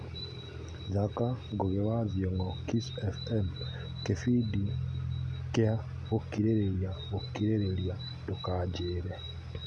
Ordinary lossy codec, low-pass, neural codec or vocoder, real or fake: none; none; none; real